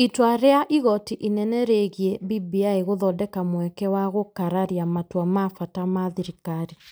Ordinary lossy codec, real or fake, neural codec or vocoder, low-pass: none; real; none; none